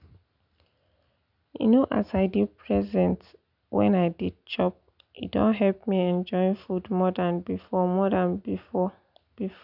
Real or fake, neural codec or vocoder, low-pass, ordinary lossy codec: real; none; 5.4 kHz; none